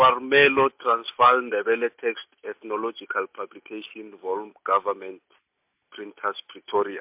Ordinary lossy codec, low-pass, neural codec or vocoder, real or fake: MP3, 32 kbps; 3.6 kHz; none; real